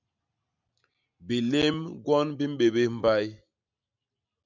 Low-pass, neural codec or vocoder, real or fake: 7.2 kHz; none; real